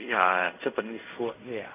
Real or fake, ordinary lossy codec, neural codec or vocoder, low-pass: fake; none; codec, 16 kHz in and 24 kHz out, 0.4 kbps, LongCat-Audio-Codec, fine tuned four codebook decoder; 3.6 kHz